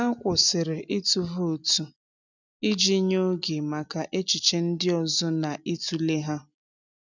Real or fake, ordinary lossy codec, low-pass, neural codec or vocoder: real; none; 7.2 kHz; none